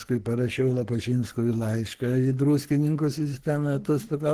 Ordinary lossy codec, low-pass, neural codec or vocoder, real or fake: Opus, 16 kbps; 14.4 kHz; codec, 44.1 kHz, 3.4 kbps, Pupu-Codec; fake